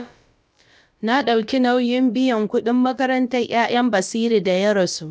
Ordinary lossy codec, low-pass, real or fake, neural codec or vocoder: none; none; fake; codec, 16 kHz, about 1 kbps, DyCAST, with the encoder's durations